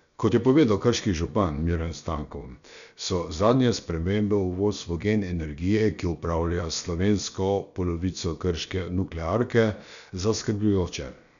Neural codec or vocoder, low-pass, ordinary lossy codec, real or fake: codec, 16 kHz, about 1 kbps, DyCAST, with the encoder's durations; 7.2 kHz; none; fake